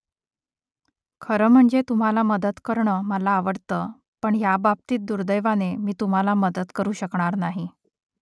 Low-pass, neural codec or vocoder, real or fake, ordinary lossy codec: none; none; real; none